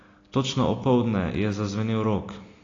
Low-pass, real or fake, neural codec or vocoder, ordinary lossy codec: 7.2 kHz; real; none; AAC, 32 kbps